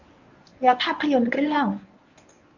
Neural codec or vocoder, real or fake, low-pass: codec, 24 kHz, 0.9 kbps, WavTokenizer, medium speech release version 1; fake; 7.2 kHz